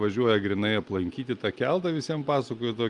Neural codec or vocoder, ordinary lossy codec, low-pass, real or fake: none; Opus, 32 kbps; 10.8 kHz; real